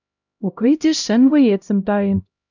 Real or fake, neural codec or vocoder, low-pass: fake; codec, 16 kHz, 0.5 kbps, X-Codec, HuBERT features, trained on LibriSpeech; 7.2 kHz